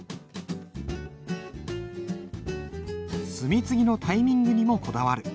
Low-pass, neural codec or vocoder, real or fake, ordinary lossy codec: none; none; real; none